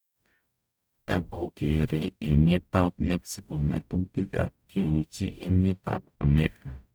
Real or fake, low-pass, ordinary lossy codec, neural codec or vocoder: fake; none; none; codec, 44.1 kHz, 0.9 kbps, DAC